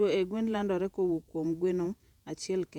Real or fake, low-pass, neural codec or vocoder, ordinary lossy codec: fake; 19.8 kHz; vocoder, 48 kHz, 128 mel bands, Vocos; none